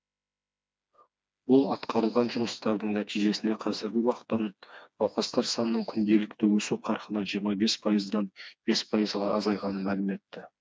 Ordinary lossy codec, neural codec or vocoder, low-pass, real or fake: none; codec, 16 kHz, 2 kbps, FreqCodec, smaller model; none; fake